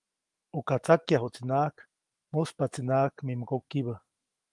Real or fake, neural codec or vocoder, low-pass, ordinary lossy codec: fake; autoencoder, 48 kHz, 128 numbers a frame, DAC-VAE, trained on Japanese speech; 10.8 kHz; Opus, 24 kbps